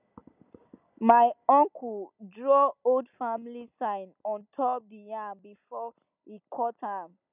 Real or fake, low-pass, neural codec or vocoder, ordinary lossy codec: real; 3.6 kHz; none; none